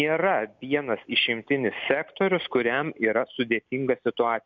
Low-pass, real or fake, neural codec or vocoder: 7.2 kHz; real; none